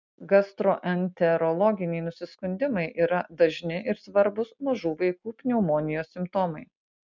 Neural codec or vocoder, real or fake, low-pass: none; real; 7.2 kHz